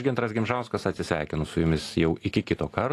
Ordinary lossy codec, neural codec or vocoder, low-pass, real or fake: AAC, 48 kbps; none; 14.4 kHz; real